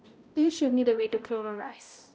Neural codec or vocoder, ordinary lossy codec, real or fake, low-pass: codec, 16 kHz, 0.5 kbps, X-Codec, HuBERT features, trained on balanced general audio; none; fake; none